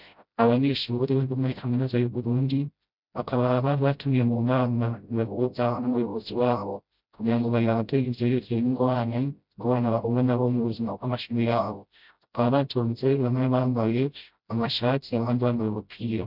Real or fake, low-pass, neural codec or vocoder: fake; 5.4 kHz; codec, 16 kHz, 0.5 kbps, FreqCodec, smaller model